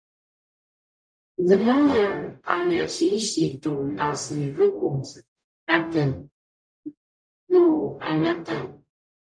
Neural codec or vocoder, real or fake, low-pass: codec, 44.1 kHz, 0.9 kbps, DAC; fake; 9.9 kHz